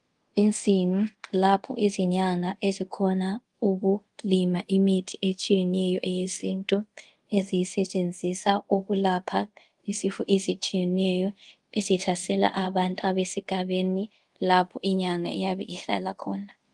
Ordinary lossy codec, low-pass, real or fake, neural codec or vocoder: Opus, 24 kbps; 10.8 kHz; fake; codec, 24 kHz, 0.5 kbps, DualCodec